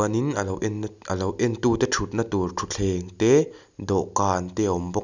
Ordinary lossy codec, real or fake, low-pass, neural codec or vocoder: none; real; 7.2 kHz; none